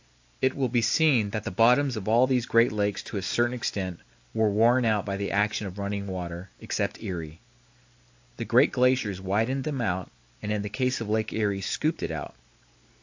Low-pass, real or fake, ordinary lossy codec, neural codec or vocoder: 7.2 kHz; real; AAC, 48 kbps; none